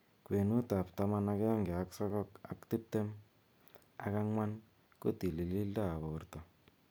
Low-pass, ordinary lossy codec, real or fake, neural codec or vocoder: none; none; real; none